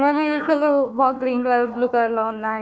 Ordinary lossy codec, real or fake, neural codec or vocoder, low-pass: none; fake; codec, 16 kHz, 1 kbps, FunCodec, trained on Chinese and English, 50 frames a second; none